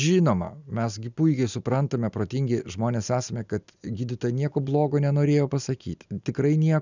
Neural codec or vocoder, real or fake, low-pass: none; real; 7.2 kHz